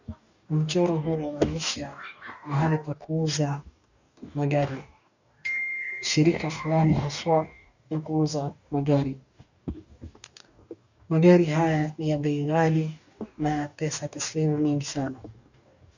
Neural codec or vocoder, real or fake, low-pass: codec, 44.1 kHz, 2.6 kbps, DAC; fake; 7.2 kHz